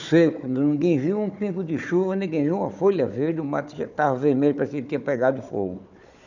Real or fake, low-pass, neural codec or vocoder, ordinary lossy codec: fake; 7.2 kHz; codec, 16 kHz, 4 kbps, FunCodec, trained on Chinese and English, 50 frames a second; none